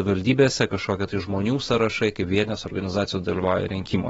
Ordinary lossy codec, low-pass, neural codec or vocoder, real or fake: AAC, 24 kbps; 19.8 kHz; autoencoder, 48 kHz, 128 numbers a frame, DAC-VAE, trained on Japanese speech; fake